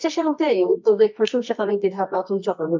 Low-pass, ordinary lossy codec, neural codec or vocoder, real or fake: 7.2 kHz; MP3, 64 kbps; codec, 24 kHz, 0.9 kbps, WavTokenizer, medium music audio release; fake